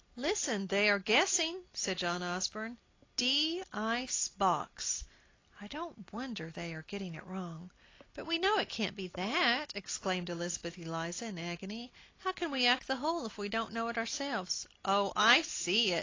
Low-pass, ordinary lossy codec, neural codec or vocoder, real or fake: 7.2 kHz; AAC, 32 kbps; none; real